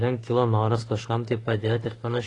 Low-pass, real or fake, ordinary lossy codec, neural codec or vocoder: 10.8 kHz; fake; AAC, 32 kbps; autoencoder, 48 kHz, 32 numbers a frame, DAC-VAE, trained on Japanese speech